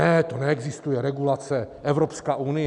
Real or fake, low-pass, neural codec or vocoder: real; 10.8 kHz; none